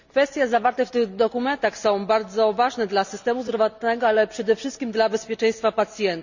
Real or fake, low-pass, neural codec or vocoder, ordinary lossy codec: real; 7.2 kHz; none; none